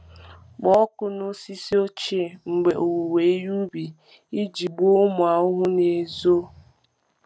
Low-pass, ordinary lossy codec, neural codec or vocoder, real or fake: none; none; none; real